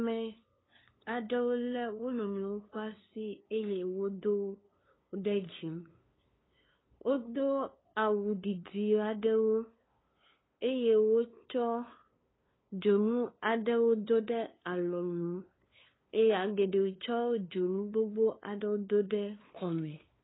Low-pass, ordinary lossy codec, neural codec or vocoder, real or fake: 7.2 kHz; AAC, 16 kbps; codec, 16 kHz, 2 kbps, FunCodec, trained on LibriTTS, 25 frames a second; fake